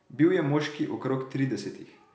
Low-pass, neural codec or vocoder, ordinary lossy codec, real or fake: none; none; none; real